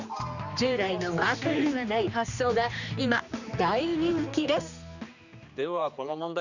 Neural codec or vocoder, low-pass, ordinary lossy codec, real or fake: codec, 16 kHz, 2 kbps, X-Codec, HuBERT features, trained on general audio; 7.2 kHz; none; fake